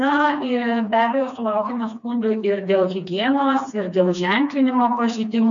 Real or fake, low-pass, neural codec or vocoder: fake; 7.2 kHz; codec, 16 kHz, 2 kbps, FreqCodec, smaller model